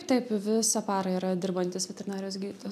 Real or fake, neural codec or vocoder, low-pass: real; none; 14.4 kHz